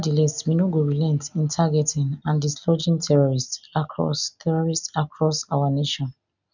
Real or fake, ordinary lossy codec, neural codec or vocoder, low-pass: real; none; none; 7.2 kHz